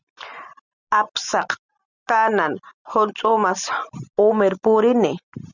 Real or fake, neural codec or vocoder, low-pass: real; none; 7.2 kHz